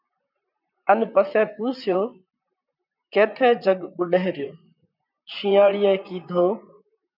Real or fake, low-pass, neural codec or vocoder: fake; 5.4 kHz; vocoder, 44.1 kHz, 128 mel bands, Pupu-Vocoder